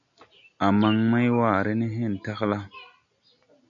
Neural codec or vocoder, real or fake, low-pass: none; real; 7.2 kHz